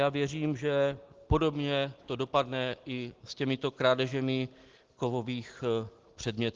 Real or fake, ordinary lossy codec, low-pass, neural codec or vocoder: real; Opus, 16 kbps; 7.2 kHz; none